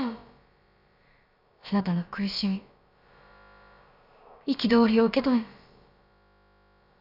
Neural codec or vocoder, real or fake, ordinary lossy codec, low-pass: codec, 16 kHz, about 1 kbps, DyCAST, with the encoder's durations; fake; none; 5.4 kHz